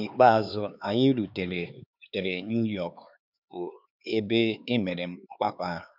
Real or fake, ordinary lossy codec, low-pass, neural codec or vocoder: fake; none; 5.4 kHz; codec, 16 kHz, 4 kbps, X-Codec, HuBERT features, trained on LibriSpeech